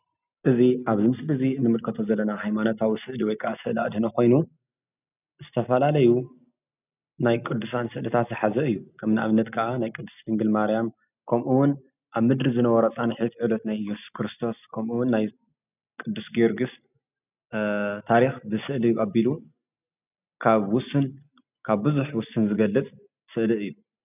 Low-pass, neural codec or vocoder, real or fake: 3.6 kHz; none; real